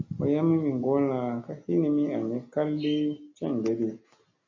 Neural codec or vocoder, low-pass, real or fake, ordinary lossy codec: none; 7.2 kHz; real; MP3, 32 kbps